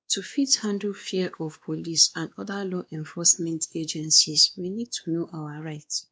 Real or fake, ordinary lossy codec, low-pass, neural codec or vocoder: fake; none; none; codec, 16 kHz, 2 kbps, X-Codec, WavLM features, trained on Multilingual LibriSpeech